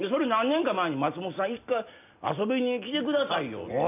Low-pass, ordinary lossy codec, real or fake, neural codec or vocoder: 3.6 kHz; none; real; none